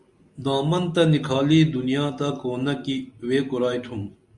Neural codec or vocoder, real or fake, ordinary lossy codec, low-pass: none; real; Opus, 64 kbps; 10.8 kHz